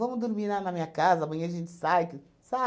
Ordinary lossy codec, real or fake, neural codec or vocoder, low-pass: none; real; none; none